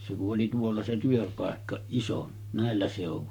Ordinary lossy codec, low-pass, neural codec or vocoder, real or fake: none; 19.8 kHz; codec, 44.1 kHz, 7.8 kbps, Pupu-Codec; fake